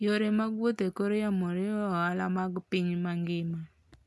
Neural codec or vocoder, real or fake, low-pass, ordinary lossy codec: none; real; none; none